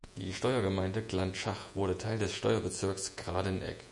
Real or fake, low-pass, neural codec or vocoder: fake; 10.8 kHz; vocoder, 48 kHz, 128 mel bands, Vocos